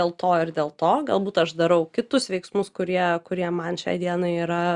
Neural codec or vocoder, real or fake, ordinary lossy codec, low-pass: none; real; Opus, 64 kbps; 10.8 kHz